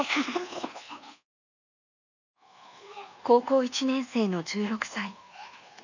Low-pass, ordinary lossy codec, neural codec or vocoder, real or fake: 7.2 kHz; none; codec, 24 kHz, 1.2 kbps, DualCodec; fake